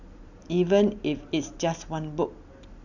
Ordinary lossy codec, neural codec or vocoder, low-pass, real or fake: none; none; 7.2 kHz; real